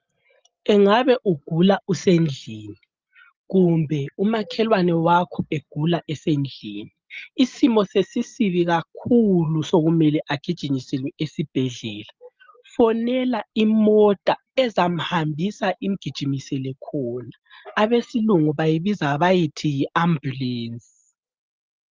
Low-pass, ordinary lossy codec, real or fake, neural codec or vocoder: 7.2 kHz; Opus, 24 kbps; real; none